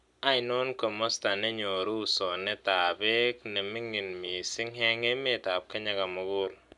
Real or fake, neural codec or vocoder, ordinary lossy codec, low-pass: real; none; none; 10.8 kHz